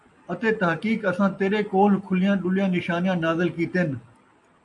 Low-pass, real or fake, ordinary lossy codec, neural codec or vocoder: 10.8 kHz; real; AAC, 64 kbps; none